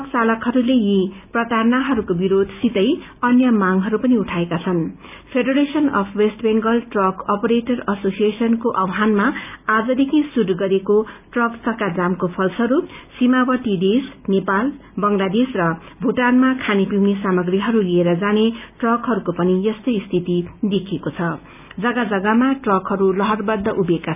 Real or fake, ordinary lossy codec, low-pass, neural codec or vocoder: real; none; 3.6 kHz; none